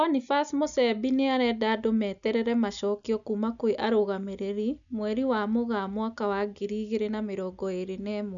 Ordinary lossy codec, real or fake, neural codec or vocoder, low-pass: none; real; none; 7.2 kHz